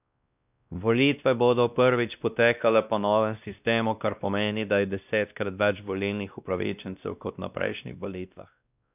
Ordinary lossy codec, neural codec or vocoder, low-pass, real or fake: none; codec, 16 kHz, 1 kbps, X-Codec, WavLM features, trained on Multilingual LibriSpeech; 3.6 kHz; fake